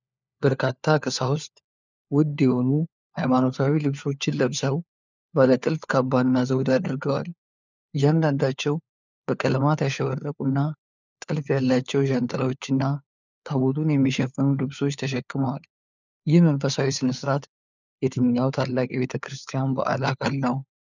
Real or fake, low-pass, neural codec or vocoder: fake; 7.2 kHz; codec, 16 kHz, 4 kbps, FunCodec, trained on LibriTTS, 50 frames a second